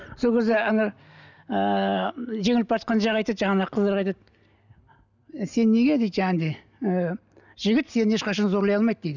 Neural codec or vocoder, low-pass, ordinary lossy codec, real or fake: none; 7.2 kHz; none; real